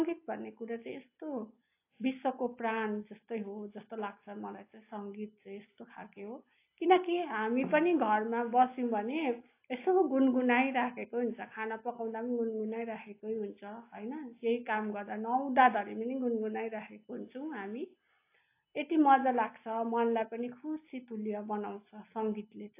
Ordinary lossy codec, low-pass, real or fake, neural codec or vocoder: AAC, 24 kbps; 3.6 kHz; real; none